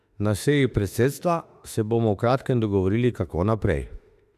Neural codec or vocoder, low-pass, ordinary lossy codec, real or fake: autoencoder, 48 kHz, 32 numbers a frame, DAC-VAE, trained on Japanese speech; 14.4 kHz; AAC, 96 kbps; fake